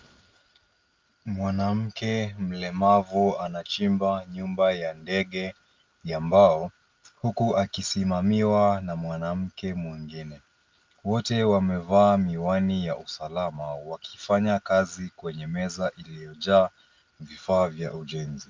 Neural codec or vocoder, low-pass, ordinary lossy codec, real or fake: none; 7.2 kHz; Opus, 24 kbps; real